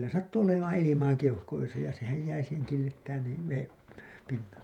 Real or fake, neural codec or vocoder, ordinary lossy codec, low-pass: fake; vocoder, 48 kHz, 128 mel bands, Vocos; none; 19.8 kHz